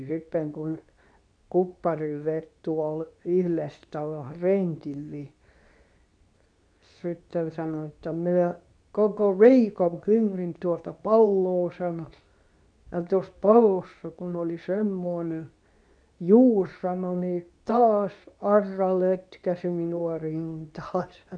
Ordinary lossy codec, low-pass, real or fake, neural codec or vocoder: none; 9.9 kHz; fake; codec, 24 kHz, 0.9 kbps, WavTokenizer, medium speech release version 2